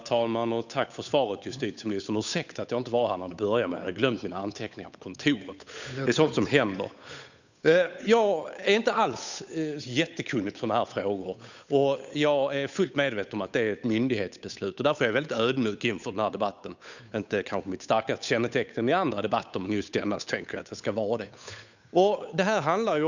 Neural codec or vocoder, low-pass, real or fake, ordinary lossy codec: codec, 16 kHz, 8 kbps, FunCodec, trained on Chinese and English, 25 frames a second; 7.2 kHz; fake; none